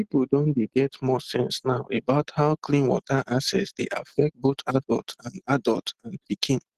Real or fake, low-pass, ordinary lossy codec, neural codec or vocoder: real; 14.4 kHz; Opus, 16 kbps; none